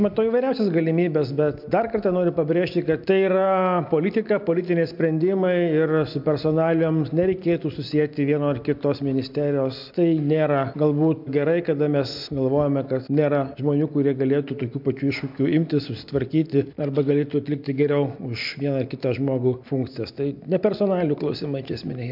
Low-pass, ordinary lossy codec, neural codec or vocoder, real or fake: 5.4 kHz; MP3, 48 kbps; none; real